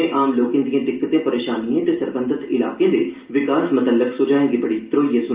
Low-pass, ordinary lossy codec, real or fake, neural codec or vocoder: 3.6 kHz; Opus, 32 kbps; real; none